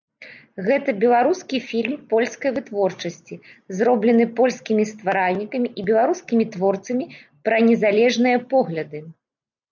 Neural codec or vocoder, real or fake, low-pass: none; real; 7.2 kHz